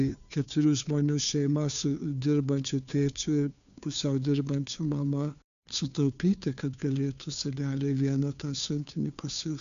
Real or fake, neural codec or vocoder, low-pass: fake; codec, 16 kHz, 2 kbps, FunCodec, trained on Chinese and English, 25 frames a second; 7.2 kHz